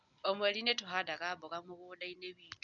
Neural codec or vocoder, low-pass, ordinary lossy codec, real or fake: none; 7.2 kHz; none; real